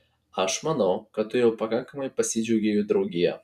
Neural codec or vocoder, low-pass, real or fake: vocoder, 44.1 kHz, 128 mel bands every 256 samples, BigVGAN v2; 14.4 kHz; fake